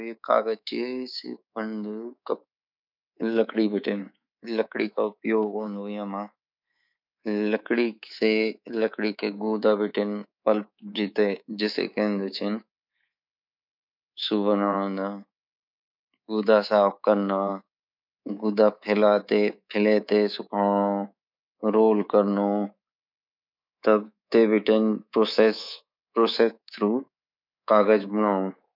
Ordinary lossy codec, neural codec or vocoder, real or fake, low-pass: none; codec, 24 kHz, 3.1 kbps, DualCodec; fake; 5.4 kHz